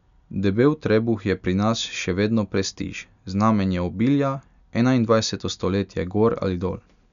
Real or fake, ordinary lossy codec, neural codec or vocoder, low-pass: real; none; none; 7.2 kHz